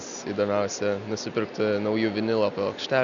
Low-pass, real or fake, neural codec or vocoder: 7.2 kHz; real; none